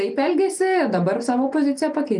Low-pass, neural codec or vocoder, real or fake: 10.8 kHz; none; real